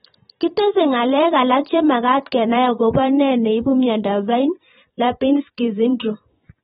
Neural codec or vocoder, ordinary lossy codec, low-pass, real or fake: vocoder, 44.1 kHz, 128 mel bands every 512 samples, BigVGAN v2; AAC, 16 kbps; 19.8 kHz; fake